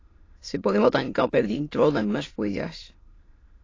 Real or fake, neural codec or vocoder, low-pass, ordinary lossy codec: fake; autoencoder, 22.05 kHz, a latent of 192 numbers a frame, VITS, trained on many speakers; 7.2 kHz; AAC, 32 kbps